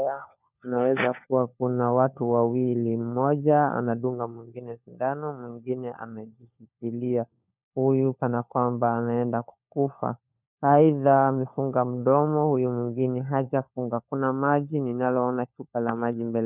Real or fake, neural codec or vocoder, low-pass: fake; codec, 16 kHz, 4 kbps, FunCodec, trained on LibriTTS, 50 frames a second; 3.6 kHz